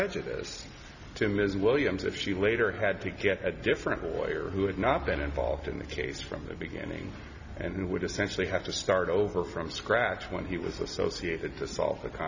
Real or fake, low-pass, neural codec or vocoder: real; 7.2 kHz; none